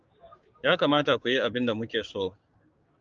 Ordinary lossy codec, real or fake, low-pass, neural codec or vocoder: Opus, 24 kbps; fake; 7.2 kHz; codec, 16 kHz, 6 kbps, DAC